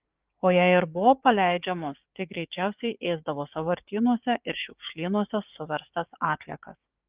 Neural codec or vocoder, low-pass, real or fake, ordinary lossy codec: codec, 16 kHz, 6 kbps, DAC; 3.6 kHz; fake; Opus, 32 kbps